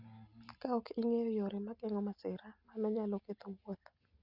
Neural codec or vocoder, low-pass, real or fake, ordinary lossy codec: none; 5.4 kHz; real; Opus, 64 kbps